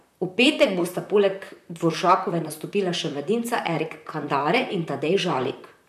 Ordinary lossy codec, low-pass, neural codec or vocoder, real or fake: none; 14.4 kHz; vocoder, 44.1 kHz, 128 mel bands, Pupu-Vocoder; fake